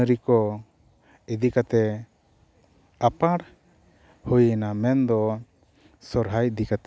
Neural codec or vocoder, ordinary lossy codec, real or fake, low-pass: none; none; real; none